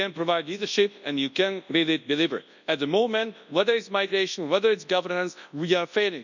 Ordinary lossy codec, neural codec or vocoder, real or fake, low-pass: none; codec, 24 kHz, 0.9 kbps, WavTokenizer, large speech release; fake; 7.2 kHz